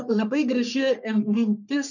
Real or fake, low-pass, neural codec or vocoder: fake; 7.2 kHz; codec, 44.1 kHz, 3.4 kbps, Pupu-Codec